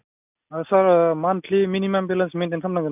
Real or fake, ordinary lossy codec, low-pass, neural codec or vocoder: real; none; 3.6 kHz; none